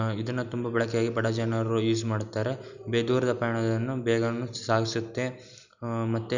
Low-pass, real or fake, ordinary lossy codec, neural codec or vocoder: 7.2 kHz; real; none; none